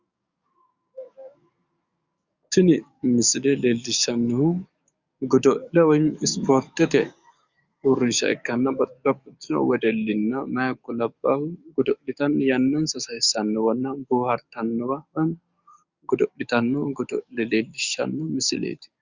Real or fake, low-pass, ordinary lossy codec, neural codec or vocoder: fake; 7.2 kHz; Opus, 64 kbps; codec, 44.1 kHz, 7.8 kbps, DAC